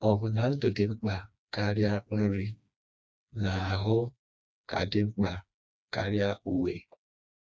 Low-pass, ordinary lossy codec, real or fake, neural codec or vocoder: none; none; fake; codec, 16 kHz, 2 kbps, FreqCodec, smaller model